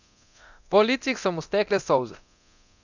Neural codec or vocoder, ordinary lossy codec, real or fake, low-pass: codec, 24 kHz, 0.9 kbps, DualCodec; none; fake; 7.2 kHz